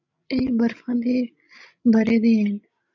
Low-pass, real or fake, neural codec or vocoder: 7.2 kHz; fake; codec, 16 kHz, 16 kbps, FreqCodec, larger model